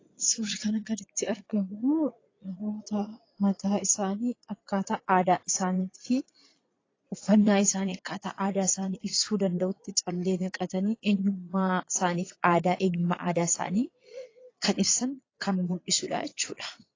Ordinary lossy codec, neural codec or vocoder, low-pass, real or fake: AAC, 32 kbps; vocoder, 22.05 kHz, 80 mel bands, Vocos; 7.2 kHz; fake